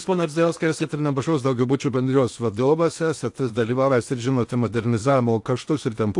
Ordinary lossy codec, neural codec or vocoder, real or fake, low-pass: AAC, 64 kbps; codec, 16 kHz in and 24 kHz out, 0.8 kbps, FocalCodec, streaming, 65536 codes; fake; 10.8 kHz